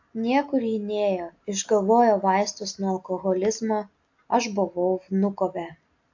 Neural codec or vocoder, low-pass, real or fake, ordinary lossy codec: none; 7.2 kHz; real; AAC, 48 kbps